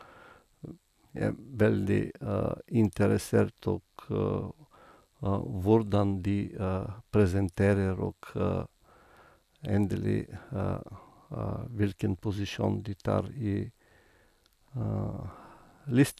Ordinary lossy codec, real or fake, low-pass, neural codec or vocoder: none; real; 14.4 kHz; none